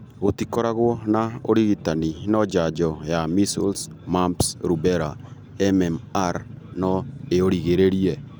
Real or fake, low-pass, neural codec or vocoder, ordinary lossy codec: real; none; none; none